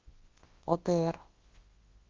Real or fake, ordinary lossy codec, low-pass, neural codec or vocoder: fake; Opus, 16 kbps; 7.2 kHz; codec, 24 kHz, 0.9 kbps, WavTokenizer, large speech release